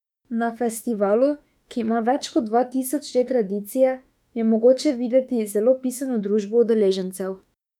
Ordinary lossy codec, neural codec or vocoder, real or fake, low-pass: none; autoencoder, 48 kHz, 32 numbers a frame, DAC-VAE, trained on Japanese speech; fake; 19.8 kHz